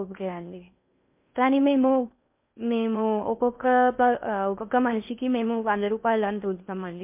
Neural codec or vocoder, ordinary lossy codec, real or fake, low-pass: codec, 16 kHz in and 24 kHz out, 0.6 kbps, FocalCodec, streaming, 4096 codes; MP3, 32 kbps; fake; 3.6 kHz